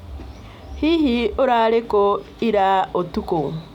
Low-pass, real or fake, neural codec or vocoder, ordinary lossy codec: 19.8 kHz; real; none; none